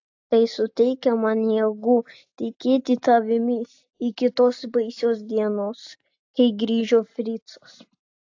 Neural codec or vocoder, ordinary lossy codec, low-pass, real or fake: none; AAC, 48 kbps; 7.2 kHz; real